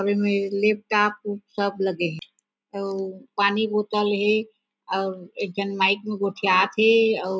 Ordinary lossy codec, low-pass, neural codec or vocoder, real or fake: none; none; none; real